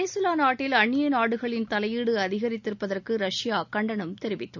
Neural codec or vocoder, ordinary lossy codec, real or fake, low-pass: none; none; real; 7.2 kHz